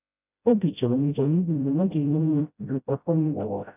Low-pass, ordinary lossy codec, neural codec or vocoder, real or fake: 3.6 kHz; none; codec, 16 kHz, 0.5 kbps, FreqCodec, smaller model; fake